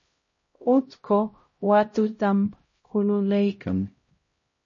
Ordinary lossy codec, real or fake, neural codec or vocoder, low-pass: MP3, 32 kbps; fake; codec, 16 kHz, 0.5 kbps, X-Codec, HuBERT features, trained on LibriSpeech; 7.2 kHz